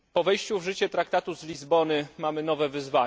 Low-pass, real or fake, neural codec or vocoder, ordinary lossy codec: none; real; none; none